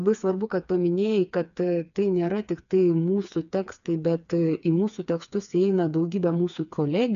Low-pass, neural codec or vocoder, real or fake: 7.2 kHz; codec, 16 kHz, 4 kbps, FreqCodec, smaller model; fake